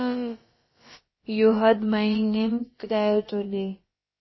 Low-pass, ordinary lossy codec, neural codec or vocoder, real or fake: 7.2 kHz; MP3, 24 kbps; codec, 16 kHz, about 1 kbps, DyCAST, with the encoder's durations; fake